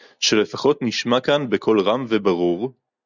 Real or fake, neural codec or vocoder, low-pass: real; none; 7.2 kHz